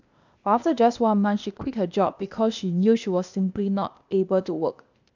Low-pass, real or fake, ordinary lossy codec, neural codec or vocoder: 7.2 kHz; fake; none; codec, 16 kHz, 0.8 kbps, ZipCodec